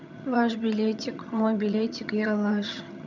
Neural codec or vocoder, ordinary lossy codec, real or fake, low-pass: vocoder, 22.05 kHz, 80 mel bands, HiFi-GAN; none; fake; 7.2 kHz